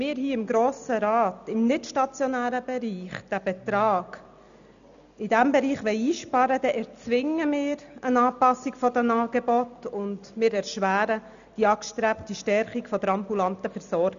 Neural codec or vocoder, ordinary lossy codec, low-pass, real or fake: none; none; 7.2 kHz; real